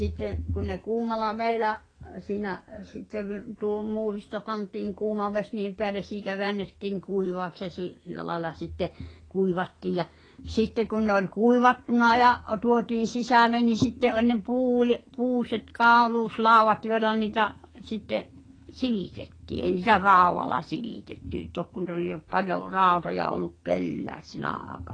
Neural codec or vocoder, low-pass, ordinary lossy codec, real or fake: codec, 44.1 kHz, 2.6 kbps, SNAC; 9.9 kHz; AAC, 32 kbps; fake